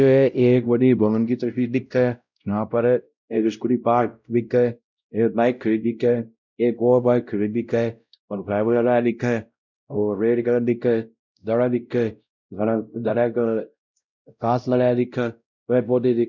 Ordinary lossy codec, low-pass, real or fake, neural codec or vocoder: none; 7.2 kHz; fake; codec, 16 kHz, 0.5 kbps, X-Codec, WavLM features, trained on Multilingual LibriSpeech